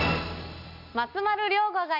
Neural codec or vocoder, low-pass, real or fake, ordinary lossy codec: none; 5.4 kHz; real; none